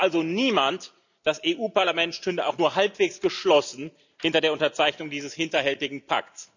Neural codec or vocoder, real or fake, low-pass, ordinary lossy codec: none; real; 7.2 kHz; none